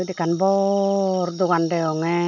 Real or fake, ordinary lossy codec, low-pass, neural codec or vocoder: real; none; 7.2 kHz; none